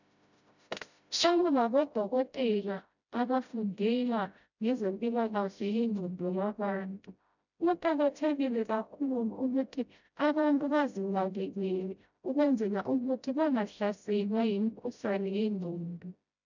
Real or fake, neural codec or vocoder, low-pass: fake; codec, 16 kHz, 0.5 kbps, FreqCodec, smaller model; 7.2 kHz